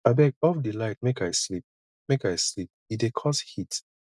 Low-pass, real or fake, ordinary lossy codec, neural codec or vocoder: none; real; none; none